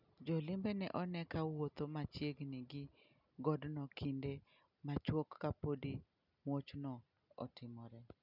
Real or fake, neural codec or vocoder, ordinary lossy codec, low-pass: real; none; none; 5.4 kHz